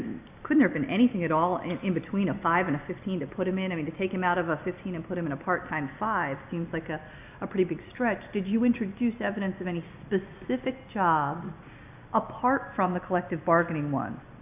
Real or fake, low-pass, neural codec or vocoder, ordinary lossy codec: real; 3.6 kHz; none; AAC, 32 kbps